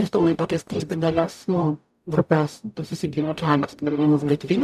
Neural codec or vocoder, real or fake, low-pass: codec, 44.1 kHz, 0.9 kbps, DAC; fake; 14.4 kHz